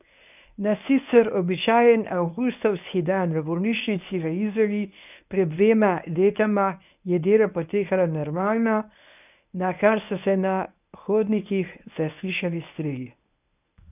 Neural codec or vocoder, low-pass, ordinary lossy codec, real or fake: codec, 24 kHz, 0.9 kbps, WavTokenizer, medium speech release version 1; 3.6 kHz; none; fake